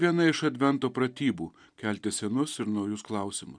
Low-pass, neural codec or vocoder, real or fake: 9.9 kHz; none; real